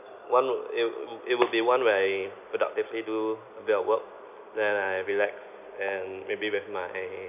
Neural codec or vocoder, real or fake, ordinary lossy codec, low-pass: none; real; none; 3.6 kHz